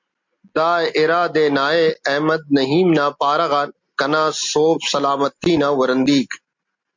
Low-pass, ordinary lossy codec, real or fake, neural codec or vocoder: 7.2 kHz; MP3, 64 kbps; real; none